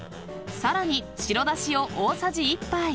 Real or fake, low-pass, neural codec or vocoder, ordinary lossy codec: real; none; none; none